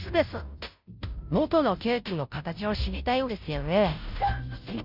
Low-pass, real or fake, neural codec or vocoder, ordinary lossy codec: 5.4 kHz; fake; codec, 16 kHz, 0.5 kbps, FunCodec, trained on Chinese and English, 25 frames a second; none